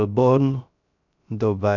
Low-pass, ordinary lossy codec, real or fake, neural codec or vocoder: 7.2 kHz; none; fake; codec, 16 kHz, 0.3 kbps, FocalCodec